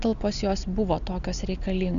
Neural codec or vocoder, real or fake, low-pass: none; real; 7.2 kHz